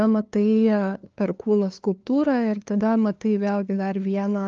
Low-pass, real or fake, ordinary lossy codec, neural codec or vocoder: 7.2 kHz; fake; Opus, 24 kbps; codec, 16 kHz, 2 kbps, FunCodec, trained on LibriTTS, 25 frames a second